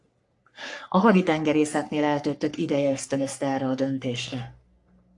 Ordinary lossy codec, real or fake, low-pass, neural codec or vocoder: AAC, 64 kbps; fake; 10.8 kHz; codec, 44.1 kHz, 3.4 kbps, Pupu-Codec